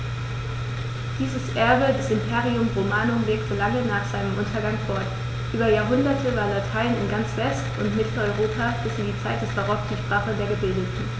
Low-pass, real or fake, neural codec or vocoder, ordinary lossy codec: none; real; none; none